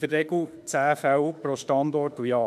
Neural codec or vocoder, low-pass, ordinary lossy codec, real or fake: autoencoder, 48 kHz, 32 numbers a frame, DAC-VAE, trained on Japanese speech; 14.4 kHz; none; fake